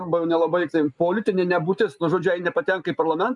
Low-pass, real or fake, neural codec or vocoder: 10.8 kHz; real; none